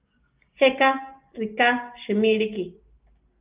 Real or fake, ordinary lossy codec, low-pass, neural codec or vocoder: real; Opus, 32 kbps; 3.6 kHz; none